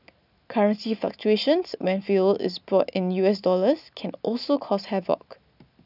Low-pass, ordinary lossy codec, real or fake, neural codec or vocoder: 5.4 kHz; none; real; none